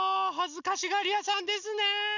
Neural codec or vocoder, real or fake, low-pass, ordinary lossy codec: none; real; 7.2 kHz; none